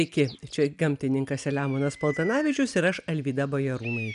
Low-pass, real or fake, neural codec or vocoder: 10.8 kHz; real; none